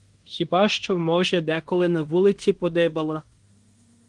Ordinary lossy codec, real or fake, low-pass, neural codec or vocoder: Opus, 24 kbps; fake; 10.8 kHz; codec, 16 kHz in and 24 kHz out, 0.9 kbps, LongCat-Audio-Codec, fine tuned four codebook decoder